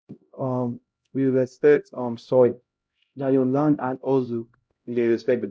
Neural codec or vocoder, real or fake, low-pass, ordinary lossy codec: codec, 16 kHz, 0.5 kbps, X-Codec, HuBERT features, trained on LibriSpeech; fake; none; none